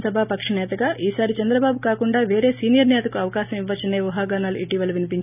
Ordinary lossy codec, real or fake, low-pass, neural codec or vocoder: none; real; 3.6 kHz; none